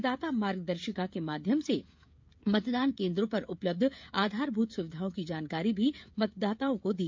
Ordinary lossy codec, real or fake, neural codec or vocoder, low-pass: MP3, 64 kbps; fake; codec, 16 kHz, 16 kbps, FreqCodec, smaller model; 7.2 kHz